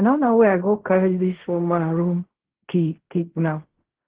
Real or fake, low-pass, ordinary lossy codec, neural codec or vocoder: fake; 3.6 kHz; Opus, 16 kbps; codec, 16 kHz in and 24 kHz out, 0.4 kbps, LongCat-Audio-Codec, fine tuned four codebook decoder